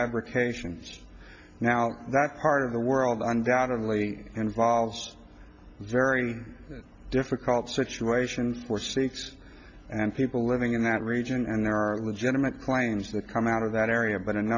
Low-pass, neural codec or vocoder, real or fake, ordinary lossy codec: 7.2 kHz; none; real; AAC, 48 kbps